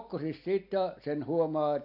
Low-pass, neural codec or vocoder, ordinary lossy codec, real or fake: 5.4 kHz; none; AAC, 48 kbps; real